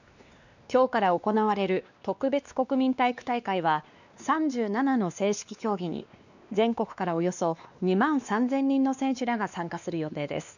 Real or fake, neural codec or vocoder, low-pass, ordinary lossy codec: fake; codec, 16 kHz, 2 kbps, X-Codec, WavLM features, trained on Multilingual LibriSpeech; 7.2 kHz; none